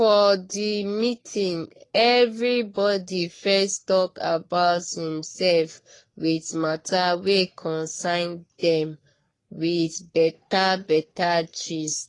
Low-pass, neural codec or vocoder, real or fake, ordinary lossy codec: 10.8 kHz; codec, 44.1 kHz, 3.4 kbps, Pupu-Codec; fake; AAC, 32 kbps